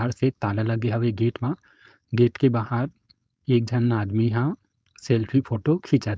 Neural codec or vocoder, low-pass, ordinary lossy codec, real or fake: codec, 16 kHz, 4.8 kbps, FACodec; none; none; fake